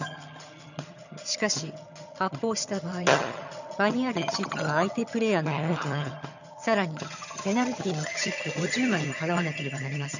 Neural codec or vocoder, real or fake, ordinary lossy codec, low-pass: vocoder, 22.05 kHz, 80 mel bands, HiFi-GAN; fake; none; 7.2 kHz